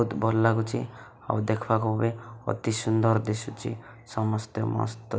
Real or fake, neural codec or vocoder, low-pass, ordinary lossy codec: real; none; none; none